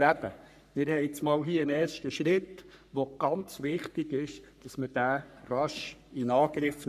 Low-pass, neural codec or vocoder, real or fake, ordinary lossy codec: 14.4 kHz; codec, 44.1 kHz, 3.4 kbps, Pupu-Codec; fake; none